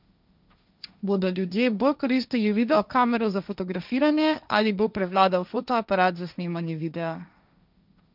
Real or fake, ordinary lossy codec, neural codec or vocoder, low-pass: fake; none; codec, 16 kHz, 1.1 kbps, Voila-Tokenizer; 5.4 kHz